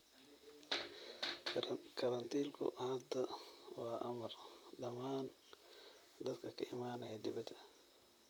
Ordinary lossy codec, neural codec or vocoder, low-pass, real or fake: none; vocoder, 44.1 kHz, 128 mel bands, Pupu-Vocoder; none; fake